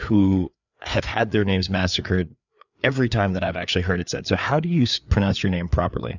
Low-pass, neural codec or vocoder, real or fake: 7.2 kHz; codec, 16 kHz, 4 kbps, FreqCodec, larger model; fake